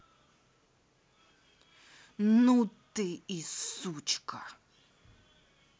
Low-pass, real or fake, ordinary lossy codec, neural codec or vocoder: none; real; none; none